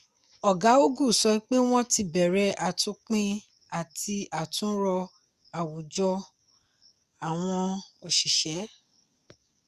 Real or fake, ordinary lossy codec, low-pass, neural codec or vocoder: fake; Opus, 64 kbps; 14.4 kHz; codec, 44.1 kHz, 7.8 kbps, DAC